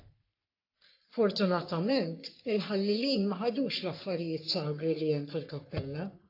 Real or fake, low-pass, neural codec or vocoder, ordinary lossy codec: fake; 5.4 kHz; codec, 44.1 kHz, 3.4 kbps, Pupu-Codec; AAC, 32 kbps